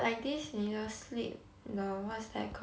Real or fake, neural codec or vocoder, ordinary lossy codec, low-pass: real; none; none; none